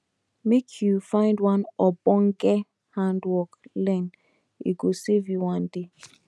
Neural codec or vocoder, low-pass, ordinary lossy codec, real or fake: none; none; none; real